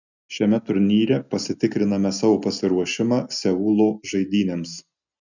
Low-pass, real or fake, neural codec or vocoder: 7.2 kHz; real; none